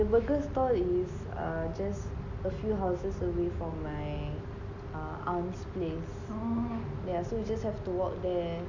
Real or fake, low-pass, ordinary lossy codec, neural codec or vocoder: real; 7.2 kHz; none; none